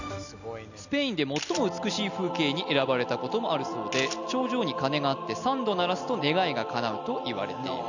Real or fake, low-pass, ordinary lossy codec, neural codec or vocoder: real; 7.2 kHz; none; none